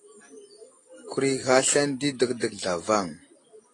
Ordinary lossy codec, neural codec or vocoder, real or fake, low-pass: AAC, 32 kbps; none; real; 10.8 kHz